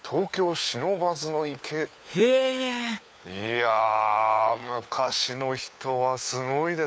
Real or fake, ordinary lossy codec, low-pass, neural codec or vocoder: fake; none; none; codec, 16 kHz, 4 kbps, FunCodec, trained on LibriTTS, 50 frames a second